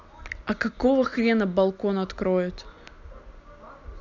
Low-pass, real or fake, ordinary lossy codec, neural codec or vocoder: 7.2 kHz; real; none; none